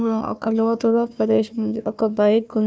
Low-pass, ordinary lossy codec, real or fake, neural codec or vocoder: none; none; fake; codec, 16 kHz, 1 kbps, FunCodec, trained on Chinese and English, 50 frames a second